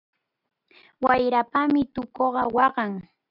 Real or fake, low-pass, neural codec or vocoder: real; 5.4 kHz; none